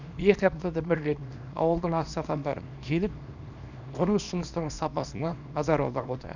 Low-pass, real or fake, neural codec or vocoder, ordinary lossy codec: 7.2 kHz; fake; codec, 24 kHz, 0.9 kbps, WavTokenizer, small release; none